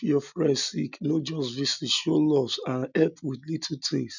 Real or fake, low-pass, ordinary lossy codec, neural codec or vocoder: real; 7.2 kHz; none; none